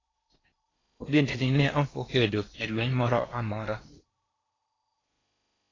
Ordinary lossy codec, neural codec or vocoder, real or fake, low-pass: AAC, 32 kbps; codec, 16 kHz in and 24 kHz out, 0.8 kbps, FocalCodec, streaming, 65536 codes; fake; 7.2 kHz